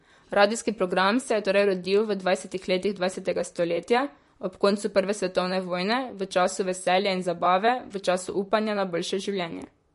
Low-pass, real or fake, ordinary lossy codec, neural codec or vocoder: 14.4 kHz; fake; MP3, 48 kbps; vocoder, 44.1 kHz, 128 mel bands, Pupu-Vocoder